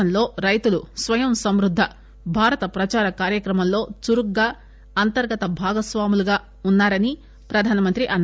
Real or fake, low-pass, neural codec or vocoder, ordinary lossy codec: real; none; none; none